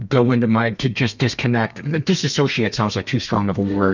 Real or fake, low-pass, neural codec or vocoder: fake; 7.2 kHz; codec, 32 kHz, 1.9 kbps, SNAC